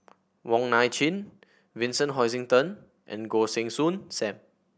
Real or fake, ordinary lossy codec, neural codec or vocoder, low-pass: real; none; none; none